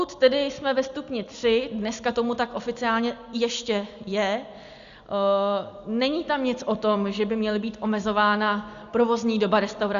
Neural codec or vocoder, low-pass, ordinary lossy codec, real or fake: none; 7.2 kHz; Opus, 64 kbps; real